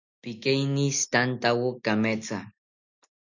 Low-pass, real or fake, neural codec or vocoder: 7.2 kHz; real; none